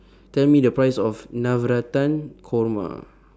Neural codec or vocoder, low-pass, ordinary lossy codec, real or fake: none; none; none; real